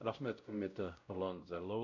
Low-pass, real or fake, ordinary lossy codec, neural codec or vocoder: 7.2 kHz; fake; none; codec, 16 kHz, 0.5 kbps, X-Codec, WavLM features, trained on Multilingual LibriSpeech